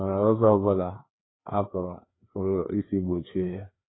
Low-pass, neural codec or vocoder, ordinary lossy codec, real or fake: 7.2 kHz; codec, 16 kHz, 2 kbps, FreqCodec, larger model; AAC, 16 kbps; fake